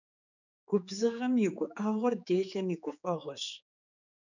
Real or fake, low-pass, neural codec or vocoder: fake; 7.2 kHz; codec, 16 kHz, 4 kbps, X-Codec, HuBERT features, trained on general audio